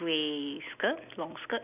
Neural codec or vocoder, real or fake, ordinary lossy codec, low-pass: none; real; none; 3.6 kHz